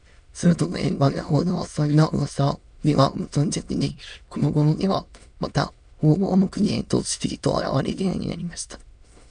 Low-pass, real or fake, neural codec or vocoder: 9.9 kHz; fake; autoencoder, 22.05 kHz, a latent of 192 numbers a frame, VITS, trained on many speakers